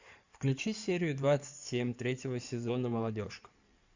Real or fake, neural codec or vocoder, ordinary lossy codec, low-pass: fake; codec, 16 kHz in and 24 kHz out, 2.2 kbps, FireRedTTS-2 codec; Opus, 64 kbps; 7.2 kHz